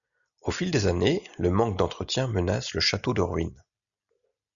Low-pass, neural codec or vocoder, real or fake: 7.2 kHz; none; real